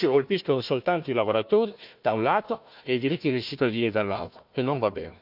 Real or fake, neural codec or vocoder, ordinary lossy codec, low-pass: fake; codec, 16 kHz, 1 kbps, FunCodec, trained on Chinese and English, 50 frames a second; none; 5.4 kHz